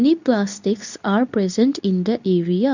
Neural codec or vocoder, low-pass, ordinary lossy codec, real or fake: codec, 24 kHz, 0.9 kbps, WavTokenizer, medium speech release version 2; 7.2 kHz; none; fake